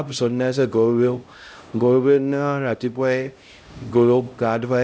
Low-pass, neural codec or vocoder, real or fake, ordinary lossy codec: none; codec, 16 kHz, 0.5 kbps, X-Codec, HuBERT features, trained on LibriSpeech; fake; none